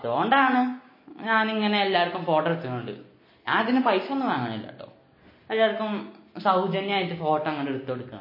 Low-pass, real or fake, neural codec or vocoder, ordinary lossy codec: 5.4 kHz; real; none; MP3, 24 kbps